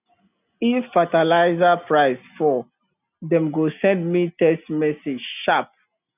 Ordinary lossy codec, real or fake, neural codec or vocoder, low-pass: none; real; none; 3.6 kHz